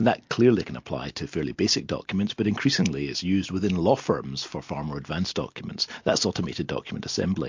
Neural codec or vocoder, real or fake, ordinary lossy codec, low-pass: none; real; MP3, 48 kbps; 7.2 kHz